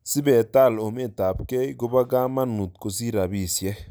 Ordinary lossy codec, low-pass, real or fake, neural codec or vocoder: none; none; real; none